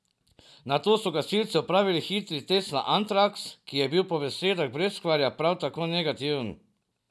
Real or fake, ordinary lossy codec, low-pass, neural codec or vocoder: real; none; none; none